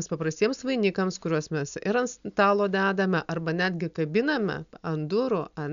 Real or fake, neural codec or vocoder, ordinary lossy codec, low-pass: real; none; MP3, 96 kbps; 7.2 kHz